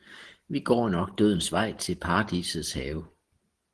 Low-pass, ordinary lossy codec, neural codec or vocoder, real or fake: 10.8 kHz; Opus, 16 kbps; none; real